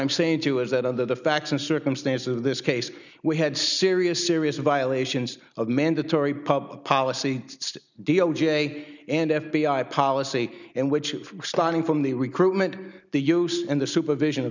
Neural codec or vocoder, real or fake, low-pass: none; real; 7.2 kHz